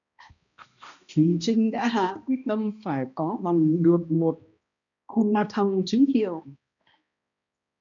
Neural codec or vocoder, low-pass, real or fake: codec, 16 kHz, 1 kbps, X-Codec, HuBERT features, trained on balanced general audio; 7.2 kHz; fake